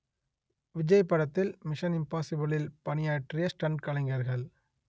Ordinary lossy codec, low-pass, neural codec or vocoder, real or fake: none; none; none; real